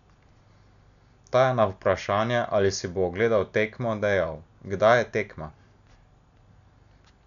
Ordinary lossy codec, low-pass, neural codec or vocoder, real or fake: none; 7.2 kHz; none; real